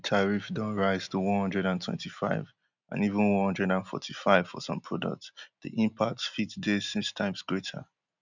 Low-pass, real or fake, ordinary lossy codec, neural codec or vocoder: 7.2 kHz; real; none; none